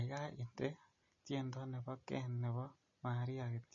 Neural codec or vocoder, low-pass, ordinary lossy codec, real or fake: none; 7.2 kHz; MP3, 32 kbps; real